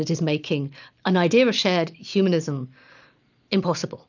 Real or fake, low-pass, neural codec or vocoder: real; 7.2 kHz; none